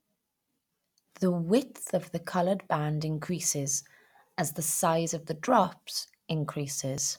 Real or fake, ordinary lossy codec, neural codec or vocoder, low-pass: real; none; none; 19.8 kHz